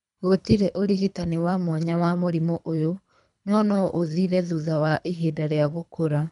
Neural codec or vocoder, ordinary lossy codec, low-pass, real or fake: codec, 24 kHz, 3 kbps, HILCodec; none; 10.8 kHz; fake